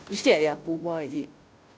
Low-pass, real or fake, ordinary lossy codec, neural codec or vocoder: none; fake; none; codec, 16 kHz, 0.5 kbps, FunCodec, trained on Chinese and English, 25 frames a second